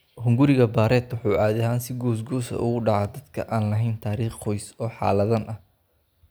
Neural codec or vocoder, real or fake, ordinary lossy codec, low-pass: none; real; none; none